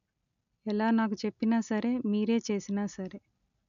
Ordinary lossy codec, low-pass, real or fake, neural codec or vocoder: none; 7.2 kHz; real; none